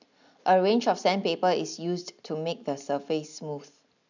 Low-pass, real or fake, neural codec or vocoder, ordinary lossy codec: 7.2 kHz; real; none; none